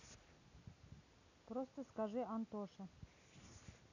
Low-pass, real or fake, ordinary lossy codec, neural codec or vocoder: 7.2 kHz; real; none; none